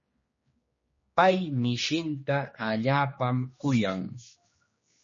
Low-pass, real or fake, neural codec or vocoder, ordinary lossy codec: 7.2 kHz; fake; codec, 16 kHz, 2 kbps, X-Codec, HuBERT features, trained on general audio; MP3, 32 kbps